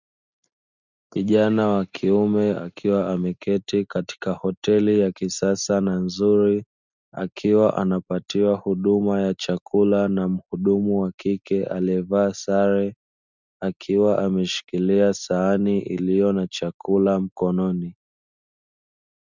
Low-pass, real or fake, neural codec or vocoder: 7.2 kHz; real; none